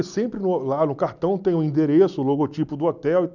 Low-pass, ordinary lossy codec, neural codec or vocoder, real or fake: 7.2 kHz; none; none; real